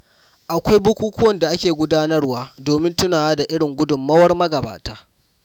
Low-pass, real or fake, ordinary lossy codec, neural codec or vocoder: 19.8 kHz; fake; none; autoencoder, 48 kHz, 128 numbers a frame, DAC-VAE, trained on Japanese speech